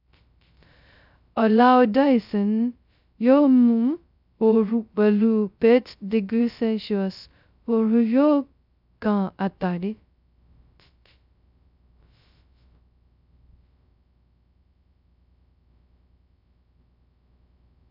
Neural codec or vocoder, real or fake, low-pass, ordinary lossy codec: codec, 16 kHz, 0.2 kbps, FocalCodec; fake; 5.4 kHz; none